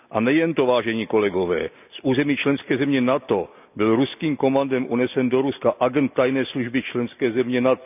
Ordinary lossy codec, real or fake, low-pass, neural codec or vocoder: none; real; 3.6 kHz; none